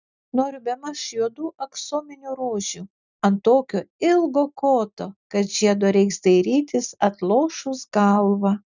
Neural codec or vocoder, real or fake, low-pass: none; real; 7.2 kHz